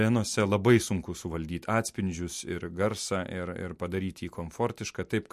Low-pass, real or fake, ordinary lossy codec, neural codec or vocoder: 14.4 kHz; real; MP3, 64 kbps; none